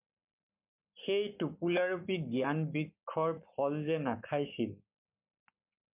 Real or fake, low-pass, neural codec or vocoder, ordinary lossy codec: fake; 3.6 kHz; codec, 44.1 kHz, 7.8 kbps, Pupu-Codec; MP3, 32 kbps